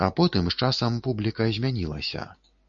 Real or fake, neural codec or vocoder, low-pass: real; none; 7.2 kHz